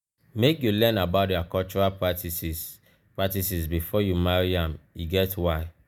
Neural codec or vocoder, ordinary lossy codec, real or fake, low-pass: none; none; real; none